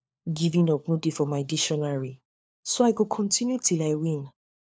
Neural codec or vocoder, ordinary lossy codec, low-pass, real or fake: codec, 16 kHz, 4 kbps, FunCodec, trained on LibriTTS, 50 frames a second; none; none; fake